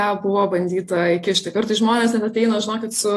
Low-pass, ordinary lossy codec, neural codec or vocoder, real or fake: 14.4 kHz; AAC, 48 kbps; none; real